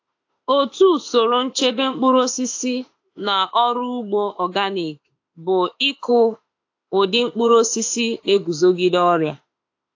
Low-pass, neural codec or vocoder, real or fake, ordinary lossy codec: 7.2 kHz; autoencoder, 48 kHz, 32 numbers a frame, DAC-VAE, trained on Japanese speech; fake; AAC, 48 kbps